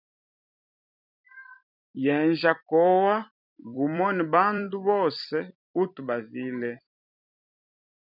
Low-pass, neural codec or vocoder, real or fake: 5.4 kHz; vocoder, 44.1 kHz, 128 mel bands every 256 samples, BigVGAN v2; fake